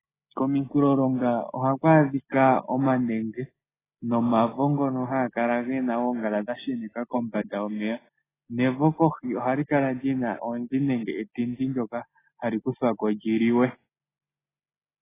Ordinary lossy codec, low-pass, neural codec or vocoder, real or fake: AAC, 16 kbps; 3.6 kHz; none; real